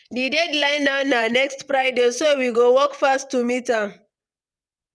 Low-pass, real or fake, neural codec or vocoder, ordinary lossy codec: none; fake; vocoder, 22.05 kHz, 80 mel bands, WaveNeXt; none